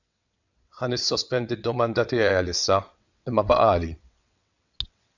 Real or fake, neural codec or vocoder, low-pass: fake; vocoder, 22.05 kHz, 80 mel bands, WaveNeXt; 7.2 kHz